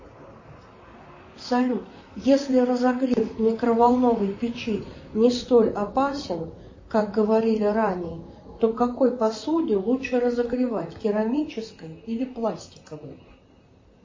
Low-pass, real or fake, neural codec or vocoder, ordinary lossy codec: 7.2 kHz; fake; vocoder, 44.1 kHz, 128 mel bands, Pupu-Vocoder; MP3, 32 kbps